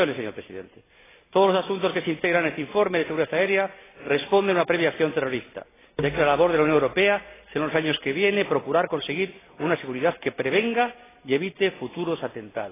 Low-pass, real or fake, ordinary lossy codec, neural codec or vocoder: 3.6 kHz; real; AAC, 16 kbps; none